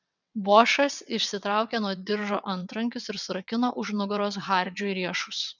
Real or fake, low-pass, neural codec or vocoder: fake; 7.2 kHz; vocoder, 22.05 kHz, 80 mel bands, WaveNeXt